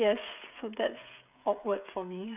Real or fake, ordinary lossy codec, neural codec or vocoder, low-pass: fake; none; codec, 16 kHz, 16 kbps, FreqCodec, smaller model; 3.6 kHz